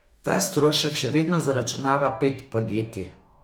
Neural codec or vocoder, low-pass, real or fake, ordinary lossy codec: codec, 44.1 kHz, 2.6 kbps, DAC; none; fake; none